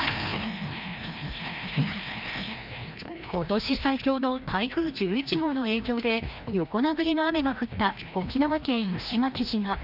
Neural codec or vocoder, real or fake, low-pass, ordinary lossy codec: codec, 16 kHz, 1 kbps, FreqCodec, larger model; fake; 5.4 kHz; none